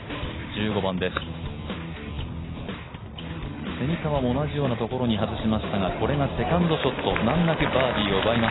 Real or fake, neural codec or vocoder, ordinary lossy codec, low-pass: real; none; AAC, 16 kbps; 7.2 kHz